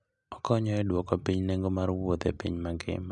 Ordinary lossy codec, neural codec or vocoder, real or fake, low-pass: none; none; real; 9.9 kHz